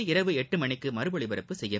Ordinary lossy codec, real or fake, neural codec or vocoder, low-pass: none; real; none; none